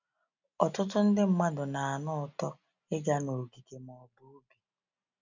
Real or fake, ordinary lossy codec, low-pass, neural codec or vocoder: real; none; 7.2 kHz; none